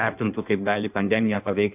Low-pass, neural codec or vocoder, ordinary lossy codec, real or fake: 3.6 kHz; codec, 16 kHz in and 24 kHz out, 1.1 kbps, FireRedTTS-2 codec; AAC, 32 kbps; fake